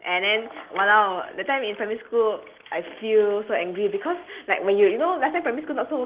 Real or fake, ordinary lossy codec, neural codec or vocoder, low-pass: real; Opus, 16 kbps; none; 3.6 kHz